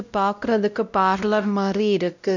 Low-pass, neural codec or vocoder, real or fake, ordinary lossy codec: 7.2 kHz; codec, 16 kHz, 0.5 kbps, X-Codec, WavLM features, trained on Multilingual LibriSpeech; fake; none